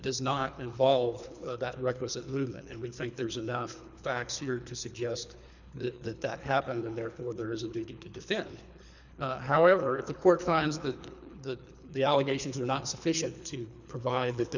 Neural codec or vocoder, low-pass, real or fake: codec, 24 kHz, 3 kbps, HILCodec; 7.2 kHz; fake